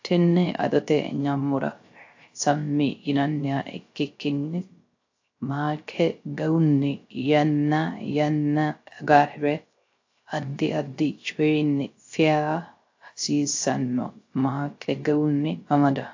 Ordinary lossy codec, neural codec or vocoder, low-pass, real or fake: AAC, 48 kbps; codec, 16 kHz, 0.3 kbps, FocalCodec; 7.2 kHz; fake